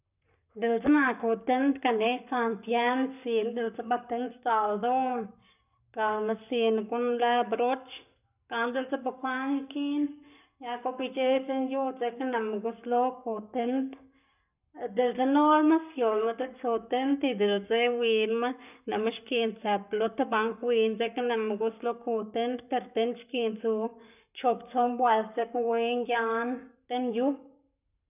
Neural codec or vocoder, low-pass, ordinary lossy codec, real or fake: codec, 44.1 kHz, 7.8 kbps, Pupu-Codec; 3.6 kHz; none; fake